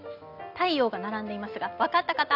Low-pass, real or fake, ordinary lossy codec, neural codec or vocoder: 5.4 kHz; real; none; none